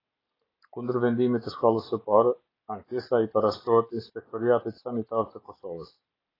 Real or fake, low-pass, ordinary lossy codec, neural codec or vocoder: real; 5.4 kHz; AAC, 24 kbps; none